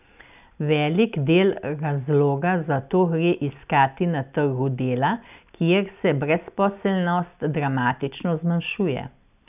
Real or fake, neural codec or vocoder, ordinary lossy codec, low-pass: real; none; none; 3.6 kHz